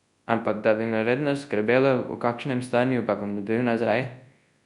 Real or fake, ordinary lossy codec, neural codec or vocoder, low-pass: fake; Opus, 64 kbps; codec, 24 kHz, 0.9 kbps, WavTokenizer, large speech release; 10.8 kHz